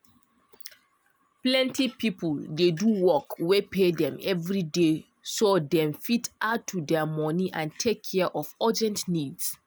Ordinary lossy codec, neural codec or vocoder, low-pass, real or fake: none; none; none; real